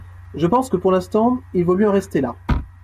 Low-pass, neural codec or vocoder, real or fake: 14.4 kHz; none; real